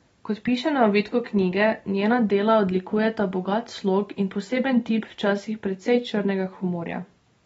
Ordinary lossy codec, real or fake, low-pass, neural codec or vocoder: AAC, 24 kbps; real; 19.8 kHz; none